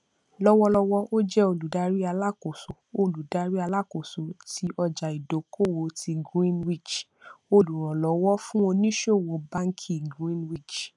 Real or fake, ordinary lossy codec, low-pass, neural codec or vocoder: real; none; 10.8 kHz; none